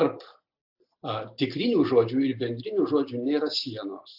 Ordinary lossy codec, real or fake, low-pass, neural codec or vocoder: AAC, 48 kbps; real; 5.4 kHz; none